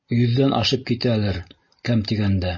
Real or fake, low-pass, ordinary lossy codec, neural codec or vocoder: real; 7.2 kHz; MP3, 32 kbps; none